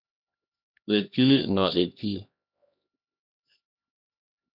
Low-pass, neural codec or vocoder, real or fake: 5.4 kHz; codec, 16 kHz, 1 kbps, X-Codec, HuBERT features, trained on LibriSpeech; fake